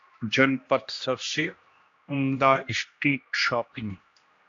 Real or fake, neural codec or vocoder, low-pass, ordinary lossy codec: fake; codec, 16 kHz, 1 kbps, X-Codec, HuBERT features, trained on general audio; 7.2 kHz; AAC, 48 kbps